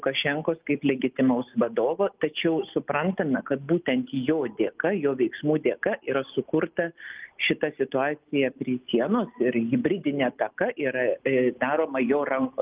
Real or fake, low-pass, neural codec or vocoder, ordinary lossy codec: real; 3.6 kHz; none; Opus, 24 kbps